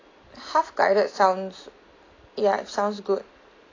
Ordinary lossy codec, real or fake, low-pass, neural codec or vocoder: AAC, 32 kbps; real; 7.2 kHz; none